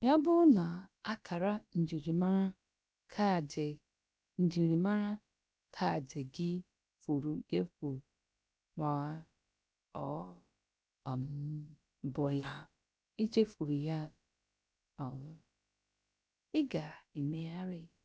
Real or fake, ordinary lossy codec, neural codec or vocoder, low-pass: fake; none; codec, 16 kHz, about 1 kbps, DyCAST, with the encoder's durations; none